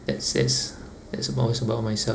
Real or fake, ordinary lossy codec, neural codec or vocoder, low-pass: real; none; none; none